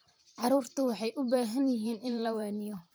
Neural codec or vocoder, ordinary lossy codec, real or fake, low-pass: vocoder, 44.1 kHz, 128 mel bands every 512 samples, BigVGAN v2; none; fake; none